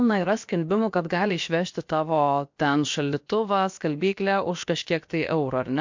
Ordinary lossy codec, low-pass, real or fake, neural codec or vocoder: MP3, 48 kbps; 7.2 kHz; fake; codec, 16 kHz, about 1 kbps, DyCAST, with the encoder's durations